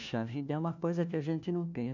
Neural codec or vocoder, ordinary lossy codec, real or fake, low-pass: codec, 16 kHz, 1 kbps, FunCodec, trained on LibriTTS, 50 frames a second; none; fake; 7.2 kHz